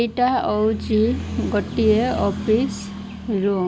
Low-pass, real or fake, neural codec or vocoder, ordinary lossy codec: none; real; none; none